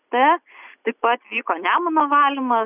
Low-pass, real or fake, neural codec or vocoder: 3.6 kHz; real; none